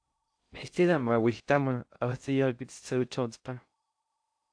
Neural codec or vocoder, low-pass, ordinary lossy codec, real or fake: codec, 16 kHz in and 24 kHz out, 0.6 kbps, FocalCodec, streaming, 2048 codes; 9.9 kHz; AAC, 64 kbps; fake